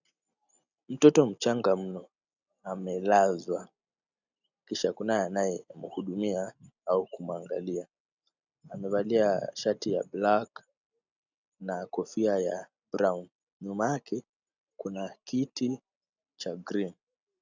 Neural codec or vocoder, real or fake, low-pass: none; real; 7.2 kHz